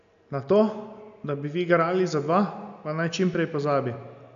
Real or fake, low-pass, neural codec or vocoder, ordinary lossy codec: real; 7.2 kHz; none; none